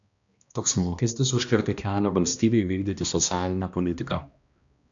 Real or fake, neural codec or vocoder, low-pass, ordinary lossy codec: fake; codec, 16 kHz, 1 kbps, X-Codec, HuBERT features, trained on balanced general audio; 7.2 kHz; AAC, 64 kbps